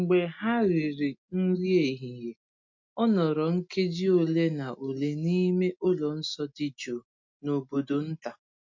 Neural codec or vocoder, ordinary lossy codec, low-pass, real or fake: none; MP3, 48 kbps; 7.2 kHz; real